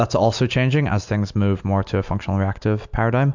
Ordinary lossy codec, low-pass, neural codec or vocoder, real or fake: MP3, 64 kbps; 7.2 kHz; none; real